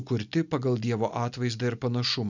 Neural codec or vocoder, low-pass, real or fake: none; 7.2 kHz; real